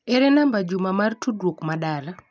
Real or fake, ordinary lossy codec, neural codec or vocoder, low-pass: real; none; none; none